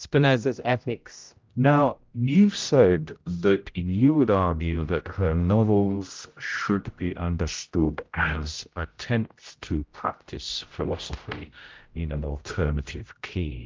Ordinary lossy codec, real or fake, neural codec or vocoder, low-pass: Opus, 24 kbps; fake; codec, 16 kHz, 0.5 kbps, X-Codec, HuBERT features, trained on general audio; 7.2 kHz